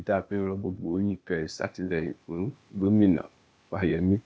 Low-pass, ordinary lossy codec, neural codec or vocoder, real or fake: none; none; codec, 16 kHz, 0.8 kbps, ZipCodec; fake